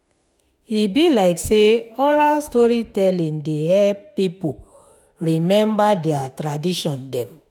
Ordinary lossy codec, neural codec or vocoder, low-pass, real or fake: none; autoencoder, 48 kHz, 32 numbers a frame, DAC-VAE, trained on Japanese speech; 19.8 kHz; fake